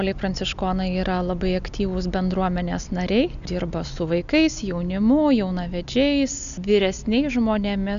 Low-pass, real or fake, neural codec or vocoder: 7.2 kHz; real; none